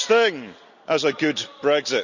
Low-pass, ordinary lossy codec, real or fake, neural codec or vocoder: 7.2 kHz; none; real; none